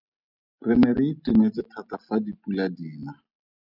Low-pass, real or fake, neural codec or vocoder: 5.4 kHz; real; none